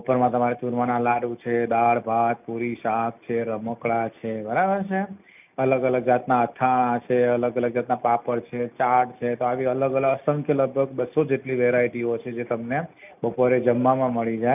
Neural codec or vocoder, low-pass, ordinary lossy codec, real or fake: none; 3.6 kHz; none; real